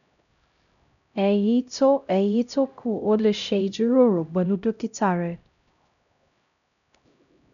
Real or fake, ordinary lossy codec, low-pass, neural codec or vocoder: fake; none; 7.2 kHz; codec, 16 kHz, 0.5 kbps, X-Codec, HuBERT features, trained on LibriSpeech